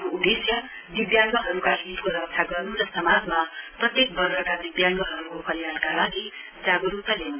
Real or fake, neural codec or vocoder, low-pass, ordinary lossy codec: real; none; 3.6 kHz; AAC, 24 kbps